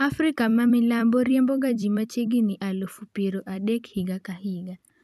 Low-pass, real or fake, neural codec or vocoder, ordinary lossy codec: 14.4 kHz; fake; vocoder, 44.1 kHz, 128 mel bands every 256 samples, BigVGAN v2; none